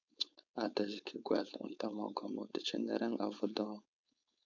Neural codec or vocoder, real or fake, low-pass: codec, 16 kHz, 4.8 kbps, FACodec; fake; 7.2 kHz